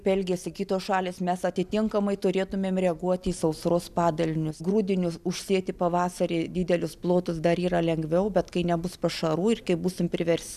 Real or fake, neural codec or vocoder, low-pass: real; none; 14.4 kHz